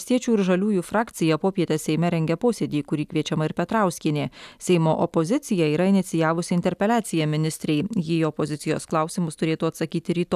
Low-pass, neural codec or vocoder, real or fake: 14.4 kHz; none; real